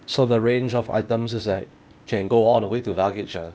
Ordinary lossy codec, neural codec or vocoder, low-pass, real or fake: none; codec, 16 kHz, 0.8 kbps, ZipCodec; none; fake